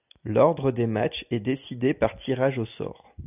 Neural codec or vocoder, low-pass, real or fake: none; 3.6 kHz; real